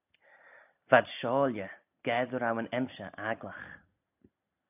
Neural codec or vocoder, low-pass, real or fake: none; 3.6 kHz; real